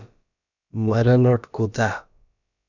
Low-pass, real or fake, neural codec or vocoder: 7.2 kHz; fake; codec, 16 kHz, about 1 kbps, DyCAST, with the encoder's durations